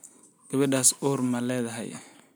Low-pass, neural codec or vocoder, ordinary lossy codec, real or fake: none; none; none; real